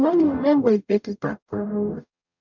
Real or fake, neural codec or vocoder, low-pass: fake; codec, 44.1 kHz, 0.9 kbps, DAC; 7.2 kHz